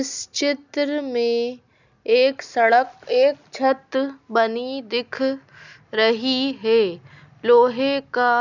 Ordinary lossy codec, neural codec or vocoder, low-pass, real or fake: none; none; 7.2 kHz; real